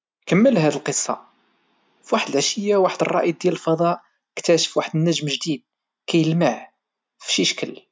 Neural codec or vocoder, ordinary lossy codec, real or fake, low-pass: none; none; real; none